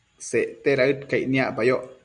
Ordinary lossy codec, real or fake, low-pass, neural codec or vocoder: Opus, 64 kbps; real; 9.9 kHz; none